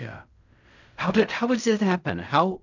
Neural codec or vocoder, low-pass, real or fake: codec, 16 kHz in and 24 kHz out, 0.4 kbps, LongCat-Audio-Codec, fine tuned four codebook decoder; 7.2 kHz; fake